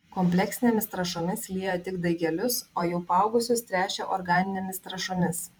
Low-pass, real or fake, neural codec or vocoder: 19.8 kHz; fake; vocoder, 44.1 kHz, 128 mel bands every 512 samples, BigVGAN v2